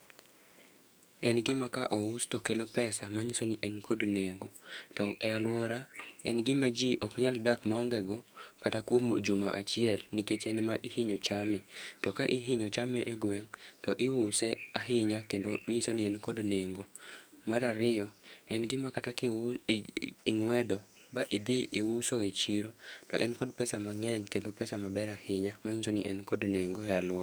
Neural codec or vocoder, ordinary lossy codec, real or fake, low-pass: codec, 44.1 kHz, 2.6 kbps, SNAC; none; fake; none